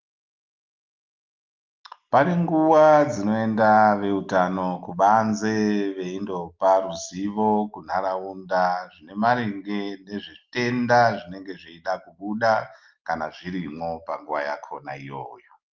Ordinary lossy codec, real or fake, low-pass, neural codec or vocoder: Opus, 32 kbps; real; 7.2 kHz; none